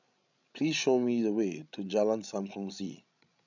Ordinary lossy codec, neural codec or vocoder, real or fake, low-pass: none; codec, 16 kHz, 16 kbps, FreqCodec, larger model; fake; 7.2 kHz